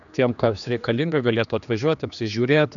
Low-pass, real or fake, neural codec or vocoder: 7.2 kHz; fake; codec, 16 kHz, 2 kbps, X-Codec, HuBERT features, trained on general audio